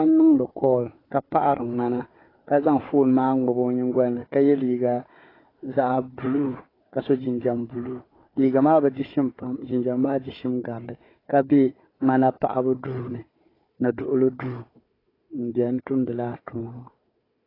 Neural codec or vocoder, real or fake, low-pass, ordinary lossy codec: codec, 16 kHz, 4 kbps, FunCodec, trained on Chinese and English, 50 frames a second; fake; 5.4 kHz; AAC, 24 kbps